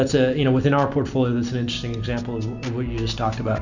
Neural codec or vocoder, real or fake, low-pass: none; real; 7.2 kHz